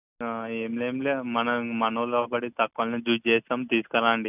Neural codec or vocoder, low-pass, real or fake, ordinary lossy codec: none; 3.6 kHz; real; none